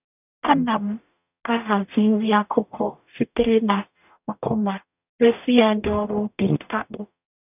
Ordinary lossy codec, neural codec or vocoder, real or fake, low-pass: none; codec, 44.1 kHz, 0.9 kbps, DAC; fake; 3.6 kHz